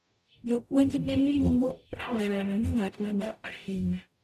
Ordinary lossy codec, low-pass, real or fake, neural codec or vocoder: none; 14.4 kHz; fake; codec, 44.1 kHz, 0.9 kbps, DAC